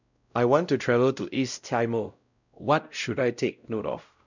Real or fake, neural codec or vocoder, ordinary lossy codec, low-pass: fake; codec, 16 kHz, 0.5 kbps, X-Codec, WavLM features, trained on Multilingual LibriSpeech; none; 7.2 kHz